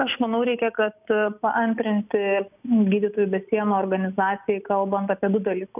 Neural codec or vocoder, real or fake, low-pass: none; real; 3.6 kHz